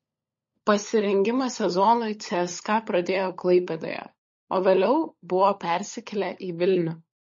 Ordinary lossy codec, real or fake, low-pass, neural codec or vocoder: MP3, 32 kbps; fake; 7.2 kHz; codec, 16 kHz, 16 kbps, FunCodec, trained on LibriTTS, 50 frames a second